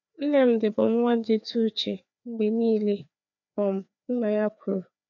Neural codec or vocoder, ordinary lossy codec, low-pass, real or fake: codec, 16 kHz, 2 kbps, FreqCodec, larger model; none; 7.2 kHz; fake